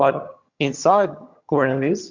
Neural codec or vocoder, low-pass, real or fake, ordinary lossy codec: vocoder, 22.05 kHz, 80 mel bands, HiFi-GAN; 7.2 kHz; fake; Opus, 64 kbps